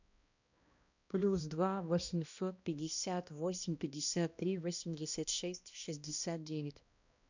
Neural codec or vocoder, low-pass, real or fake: codec, 16 kHz, 1 kbps, X-Codec, HuBERT features, trained on balanced general audio; 7.2 kHz; fake